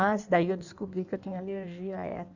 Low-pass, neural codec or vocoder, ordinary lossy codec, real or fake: 7.2 kHz; codec, 16 kHz in and 24 kHz out, 1.1 kbps, FireRedTTS-2 codec; none; fake